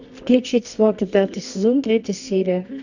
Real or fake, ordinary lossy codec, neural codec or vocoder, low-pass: fake; none; codec, 24 kHz, 0.9 kbps, WavTokenizer, medium music audio release; 7.2 kHz